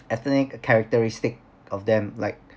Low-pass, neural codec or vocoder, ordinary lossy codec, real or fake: none; none; none; real